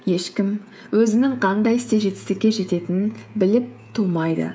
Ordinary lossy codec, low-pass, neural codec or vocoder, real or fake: none; none; codec, 16 kHz, 16 kbps, FreqCodec, smaller model; fake